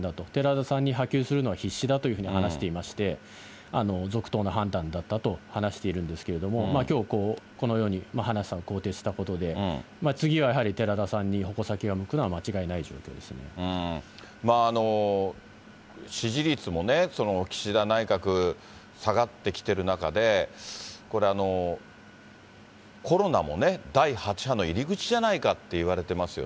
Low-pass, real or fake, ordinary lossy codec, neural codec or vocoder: none; real; none; none